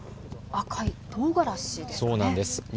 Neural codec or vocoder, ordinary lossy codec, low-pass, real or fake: none; none; none; real